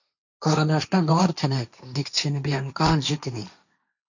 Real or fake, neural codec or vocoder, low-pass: fake; codec, 16 kHz, 1.1 kbps, Voila-Tokenizer; 7.2 kHz